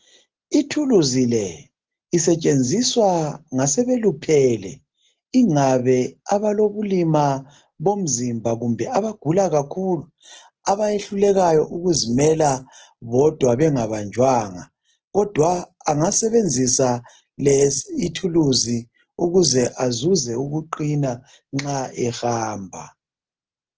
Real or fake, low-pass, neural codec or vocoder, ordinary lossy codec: real; 7.2 kHz; none; Opus, 16 kbps